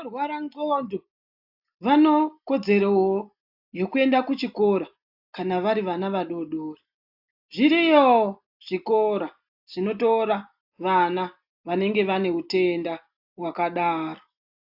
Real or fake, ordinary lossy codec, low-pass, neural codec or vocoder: real; AAC, 48 kbps; 5.4 kHz; none